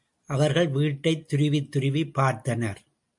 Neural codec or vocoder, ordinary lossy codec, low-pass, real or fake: none; MP3, 64 kbps; 10.8 kHz; real